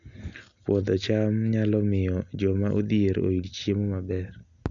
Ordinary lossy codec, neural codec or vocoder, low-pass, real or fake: none; none; 7.2 kHz; real